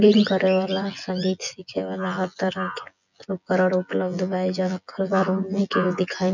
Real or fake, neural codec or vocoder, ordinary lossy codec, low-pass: fake; vocoder, 24 kHz, 100 mel bands, Vocos; none; 7.2 kHz